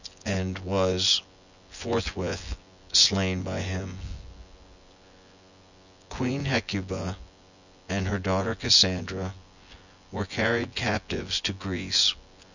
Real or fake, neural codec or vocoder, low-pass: fake; vocoder, 24 kHz, 100 mel bands, Vocos; 7.2 kHz